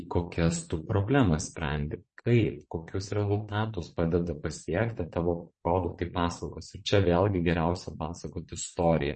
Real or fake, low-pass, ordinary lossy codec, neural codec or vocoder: fake; 9.9 kHz; MP3, 32 kbps; vocoder, 22.05 kHz, 80 mel bands, Vocos